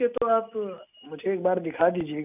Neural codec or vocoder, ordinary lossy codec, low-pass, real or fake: none; none; 3.6 kHz; real